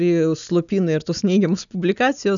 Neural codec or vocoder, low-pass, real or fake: none; 7.2 kHz; real